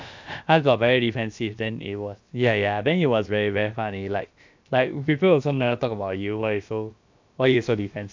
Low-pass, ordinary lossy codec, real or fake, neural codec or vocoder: 7.2 kHz; MP3, 64 kbps; fake; codec, 16 kHz, about 1 kbps, DyCAST, with the encoder's durations